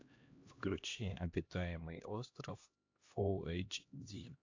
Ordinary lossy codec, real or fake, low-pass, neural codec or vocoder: AAC, 48 kbps; fake; 7.2 kHz; codec, 16 kHz, 1 kbps, X-Codec, HuBERT features, trained on LibriSpeech